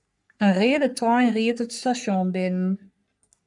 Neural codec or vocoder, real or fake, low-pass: codec, 44.1 kHz, 3.4 kbps, Pupu-Codec; fake; 10.8 kHz